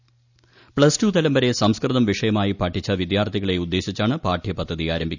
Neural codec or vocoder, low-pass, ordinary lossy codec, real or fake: none; 7.2 kHz; none; real